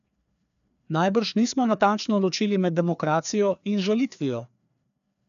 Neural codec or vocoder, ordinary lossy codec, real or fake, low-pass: codec, 16 kHz, 2 kbps, FreqCodec, larger model; none; fake; 7.2 kHz